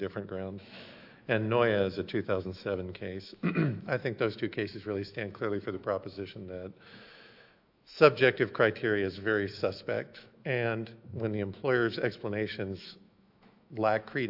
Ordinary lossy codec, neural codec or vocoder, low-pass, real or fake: AAC, 48 kbps; autoencoder, 48 kHz, 128 numbers a frame, DAC-VAE, trained on Japanese speech; 5.4 kHz; fake